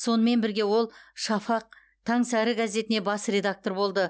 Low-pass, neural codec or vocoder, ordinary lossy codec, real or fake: none; none; none; real